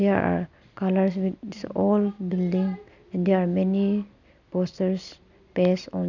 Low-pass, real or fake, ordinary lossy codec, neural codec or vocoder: 7.2 kHz; real; none; none